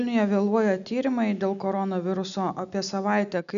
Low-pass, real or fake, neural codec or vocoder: 7.2 kHz; real; none